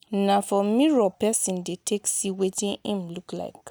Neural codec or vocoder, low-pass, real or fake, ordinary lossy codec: none; none; real; none